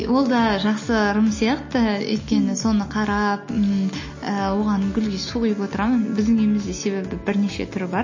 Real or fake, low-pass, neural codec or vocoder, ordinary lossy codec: real; 7.2 kHz; none; MP3, 32 kbps